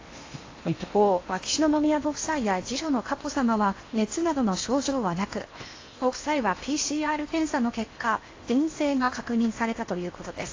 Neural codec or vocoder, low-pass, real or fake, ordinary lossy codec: codec, 16 kHz in and 24 kHz out, 0.8 kbps, FocalCodec, streaming, 65536 codes; 7.2 kHz; fake; AAC, 32 kbps